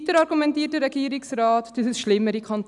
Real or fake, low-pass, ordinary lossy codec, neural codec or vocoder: real; 10.8 kHz; none; none